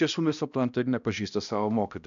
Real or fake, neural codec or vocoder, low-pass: fake; codec, 16 kHz, 1 kbps, X-Codec, HuBERT features, trained on balanced general audio; 7.2 kHz